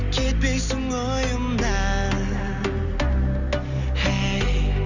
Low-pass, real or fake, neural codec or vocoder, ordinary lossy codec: 7.2 kHz; real; none; none